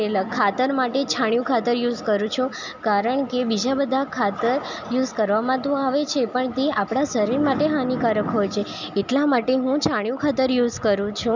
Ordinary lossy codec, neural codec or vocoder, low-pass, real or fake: none; none; 7.2 kHz; real